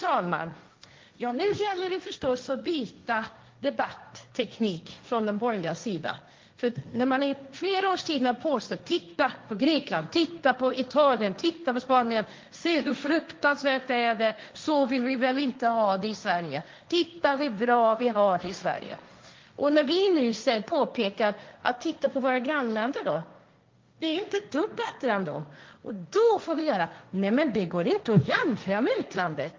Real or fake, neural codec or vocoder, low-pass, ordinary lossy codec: fake; codec, 16 kHz, 1.1 kbps, Voila-Tokenizer; 7.2 kHz; Opus, 32 kbps